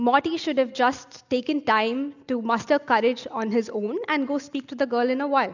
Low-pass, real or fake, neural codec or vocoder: 7.2 kHz; real; none